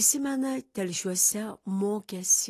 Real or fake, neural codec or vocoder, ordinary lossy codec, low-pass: real; none; AAC, 48 kbps; 14.4 kHz